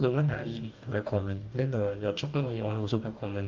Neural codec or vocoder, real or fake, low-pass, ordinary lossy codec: codec, 44.1 kHz, 2.6 kbps, DAC; fake; 7.2 kHz; Opus, 32 kbps